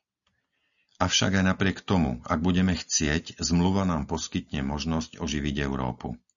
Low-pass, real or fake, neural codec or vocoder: 7.2 kHz; real; none